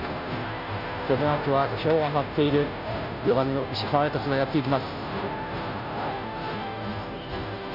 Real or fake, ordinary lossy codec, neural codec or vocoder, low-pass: fake; none; codec, 16 kHz, 0.5 kbps, FunCodec, trained on Chinese and English, 25 frames a second; 5.4 kHz